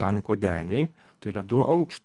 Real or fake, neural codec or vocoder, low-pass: fake; codec, 24 kHz, 1.5 kbps, HILCodec; 10.8 kHz